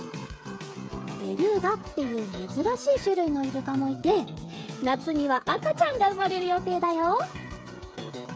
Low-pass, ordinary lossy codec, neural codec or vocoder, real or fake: none; none; codec, 16 kHz, 8 kbps, FreqCodec, smaller model; fake